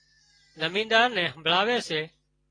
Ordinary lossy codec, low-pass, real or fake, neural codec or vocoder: AAC, 32 kbps; 9.9 kHz; real; none